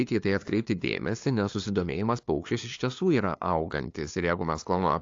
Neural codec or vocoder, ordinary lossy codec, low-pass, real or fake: codec, 16 kHz, 2 kbps, FunCodec, trained on LibriTTS, 25 frames a second; AAC, 48 kbps; 7.2 kHz; fake